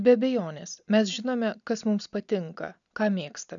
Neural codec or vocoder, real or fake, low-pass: none; real; 7.2 kHz